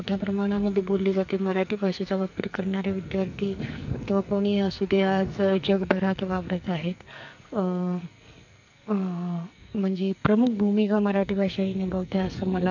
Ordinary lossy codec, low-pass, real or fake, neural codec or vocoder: none; 7.2 kHz; fake; codec, 44.1 kHz, 2.6 kbps, SNAC